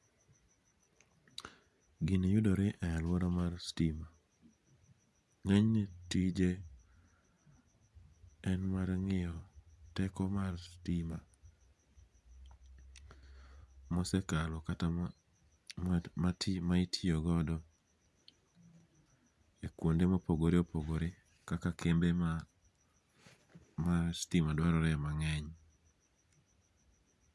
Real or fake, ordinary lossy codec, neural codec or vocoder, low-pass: real; none; none; none